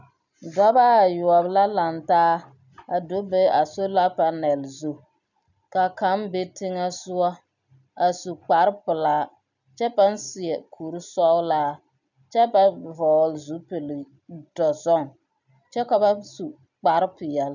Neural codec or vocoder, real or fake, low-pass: none; real; 7.2 kHz